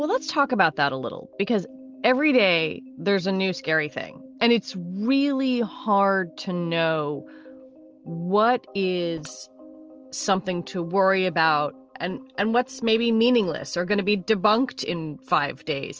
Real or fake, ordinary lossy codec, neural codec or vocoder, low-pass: real; Opus, 24 kbps; none; 7.2 kHz